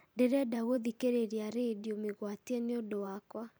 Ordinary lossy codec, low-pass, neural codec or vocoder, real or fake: none; none; vocoder, 44.1 kHz, 128 mel bands every 512 samples, BigVGAN v2; fake